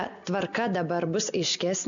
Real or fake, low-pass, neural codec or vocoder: real; 7.2 kHz; none